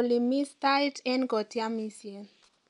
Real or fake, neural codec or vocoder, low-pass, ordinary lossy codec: real; none; 10.8 kHz; none